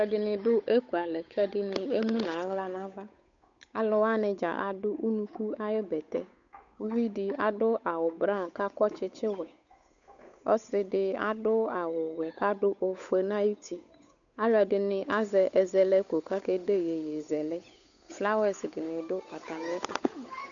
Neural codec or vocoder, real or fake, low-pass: codec, 16 kHz, 8 kbps, FunCodec, trained on Chinese and English, 25 frames a second; fake; 7.2 kHz